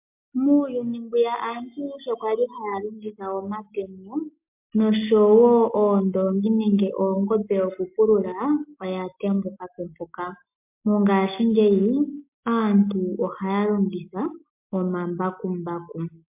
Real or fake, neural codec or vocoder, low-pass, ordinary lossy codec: real; none; 3.6 kHz; MP3, 32 kbps